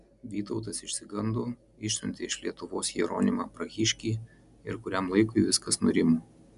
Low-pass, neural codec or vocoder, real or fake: 10.8 kHz; none; real